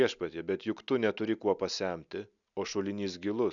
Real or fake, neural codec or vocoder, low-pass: real; none; 7.2 kHz